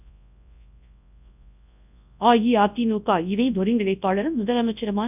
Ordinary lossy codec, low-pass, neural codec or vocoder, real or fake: none; 3.6 kHz; codec, 24 kHz, 0.9 kbps, WavTokenizer, large speech release; fake